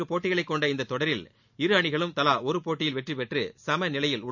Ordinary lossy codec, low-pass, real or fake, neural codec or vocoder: none; 7.2 kHz; real; none